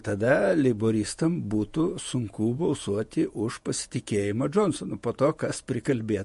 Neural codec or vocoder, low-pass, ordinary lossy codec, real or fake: vocoder, 48 kHz, 128 mel bands, Vocos; 14.4 kHz; MP3, 48 kbps; fake